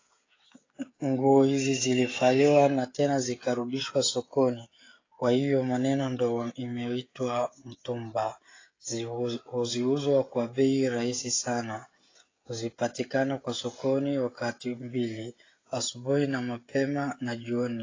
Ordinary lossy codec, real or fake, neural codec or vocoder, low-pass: AAC, 32 kbps; fake; codec, 16 kHz, 16 kbps, FreqCodec, smaller model; 7.2 kHz